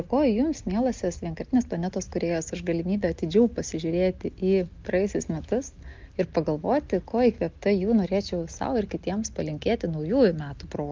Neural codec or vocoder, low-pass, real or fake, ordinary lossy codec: none; 7.2 kHz; real; Opus, 32 kbps